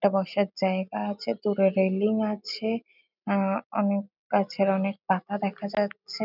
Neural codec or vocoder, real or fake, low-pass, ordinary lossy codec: none; real; 5.4 kHz; none